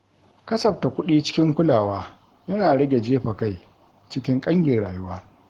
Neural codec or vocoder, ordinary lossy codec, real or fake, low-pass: codec, 44.1 kHz, 7.8 kbps, Pupu-Codec; Opus, 16 kbps; fake; 19.8 kHz